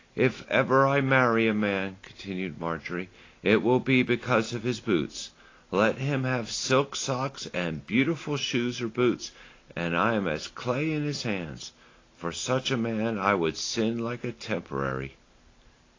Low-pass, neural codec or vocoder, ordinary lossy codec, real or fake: 7.2 kHz; none; AAC, 32 kbps; real